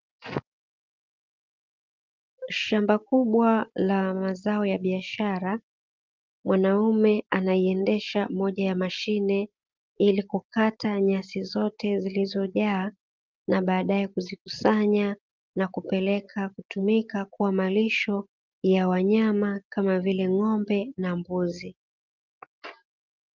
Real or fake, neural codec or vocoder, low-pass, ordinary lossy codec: real; none; 7.2 kHz; Opus, 32 kbps